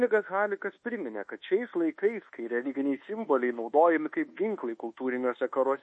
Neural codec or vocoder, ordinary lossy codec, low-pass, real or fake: codec, 24 kHz, 1.2 kbps, DualCodec; MP3, 32 kbps; 10.8 kHz; fake